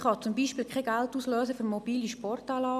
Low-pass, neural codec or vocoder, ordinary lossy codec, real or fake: 14.4 kHz; none; none; real